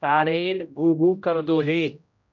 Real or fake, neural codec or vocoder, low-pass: fake; codec, 16 kHz, 0.5 kbps, X-Codec, HuBERT features, trained on general audio; 7.2 kHz